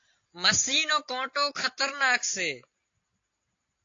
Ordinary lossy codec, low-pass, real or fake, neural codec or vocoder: AAC, 48 kbps; 7.2 kHz; real; none